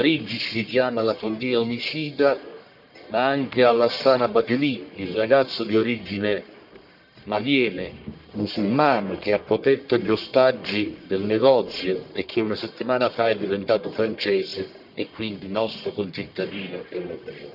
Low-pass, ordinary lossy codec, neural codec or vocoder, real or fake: 5.4 kHz; none; codec, 44.1 kHz, 1.7 kbps, Pupu-Codec; fake